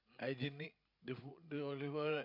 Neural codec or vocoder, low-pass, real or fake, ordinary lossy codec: codec, 16 kHz, 16 kbps, FreqCodec, larger model; 5.4 kHz; fake; MP3, 48 kbps